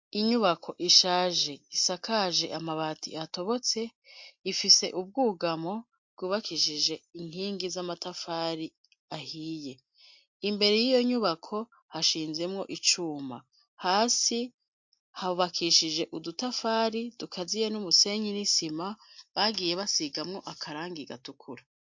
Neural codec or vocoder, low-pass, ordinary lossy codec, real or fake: none; 7.2 kHz; MP3, 48 kbps; real